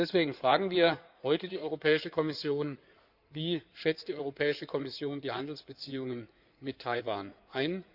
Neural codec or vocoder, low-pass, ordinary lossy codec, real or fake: codec, 16 kHz in and 24 kHz out, 2.2 kbps, FireRedTTS-2 codec; 5.4 kHz; none; fake